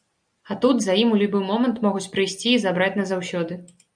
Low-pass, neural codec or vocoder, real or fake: 9.9 kHz; none; real